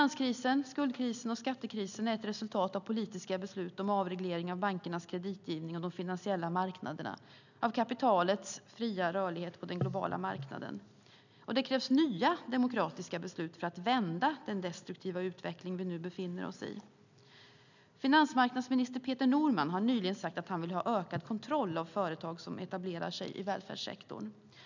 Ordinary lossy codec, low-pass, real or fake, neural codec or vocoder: none; 7.2 kHz; real; none